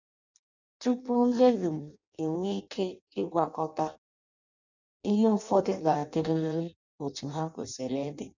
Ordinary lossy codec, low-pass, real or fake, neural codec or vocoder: none; 7.2 kHz; fake; codec, 16 kHz in and 24 kHz out, 0.6 kbps, FireRedTTS-2 codec